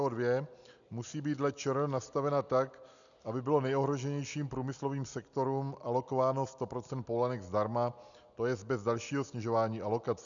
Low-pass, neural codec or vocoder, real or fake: 7.2 kHz; none; real